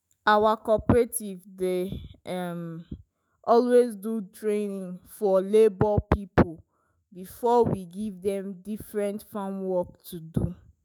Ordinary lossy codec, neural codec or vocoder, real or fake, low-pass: none; autoencoder, 48 kHz, 128 numbers a frame, DAC-VAE, trained on Japanese speech; fake; none